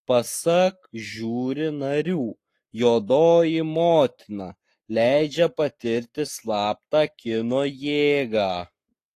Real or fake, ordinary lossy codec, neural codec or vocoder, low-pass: fake; AAC, 48 kbps; codec, 44.1 kHz, 7.8 kbps, Pupu-Codec; 14.4 kHz